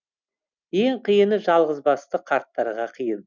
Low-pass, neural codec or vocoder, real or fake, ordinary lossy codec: 7.2 kHz; none; real; none